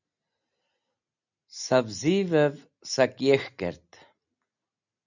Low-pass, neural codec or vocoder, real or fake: 7.2 kHz; none; real